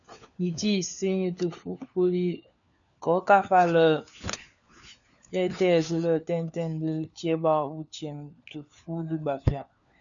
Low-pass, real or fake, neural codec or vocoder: 7.2 kHz; fake; codec, 16 kHz, 4 kbps, FunCodec, trained on LibriTTS, 50 frames a second